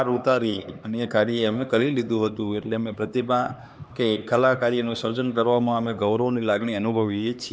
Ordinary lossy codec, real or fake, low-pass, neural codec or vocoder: none; fake; none; codec, 16 kHz, 2 kbps, X-Codec, HuBERT features, trained on LibriSpeech